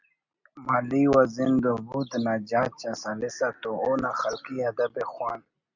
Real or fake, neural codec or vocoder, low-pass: real; none; 7.2 kHz